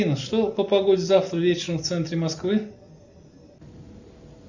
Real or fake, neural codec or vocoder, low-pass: real; none; 7.2 kHz